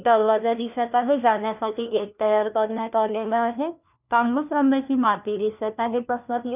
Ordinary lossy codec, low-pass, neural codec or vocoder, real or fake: none; 3.6 kHz; codec, 16 kHz, 1 kbps, FunCodec, trained on LibriTTS, 50 frames a second; fake